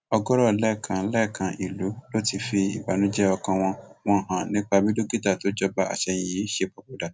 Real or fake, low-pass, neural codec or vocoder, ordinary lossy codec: real; none; none; none